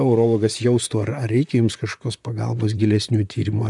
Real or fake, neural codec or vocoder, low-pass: fake; vocoder, 44.1 kHz, 128 mel bands, Pupu-Vocoder; 10.8 kHz